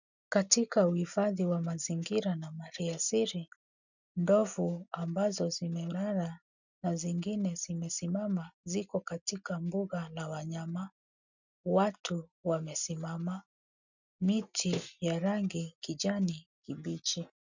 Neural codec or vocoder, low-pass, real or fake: none; 7.2 kHz; real